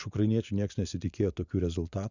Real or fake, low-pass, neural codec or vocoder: fake; 7.2 kHz; vocoder, 24 kHz, 100 mel bands, Vocos